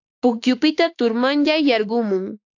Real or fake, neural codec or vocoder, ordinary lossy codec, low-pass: fake; autoencoder, 48 kHz, 32 numbers a frame, DAC-VAE, trained on Japanese speech; AAC, 48 kbps; 7.2 kHz